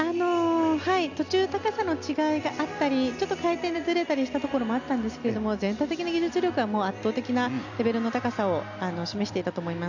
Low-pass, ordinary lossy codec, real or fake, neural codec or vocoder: 7.2 kHz; none; real; none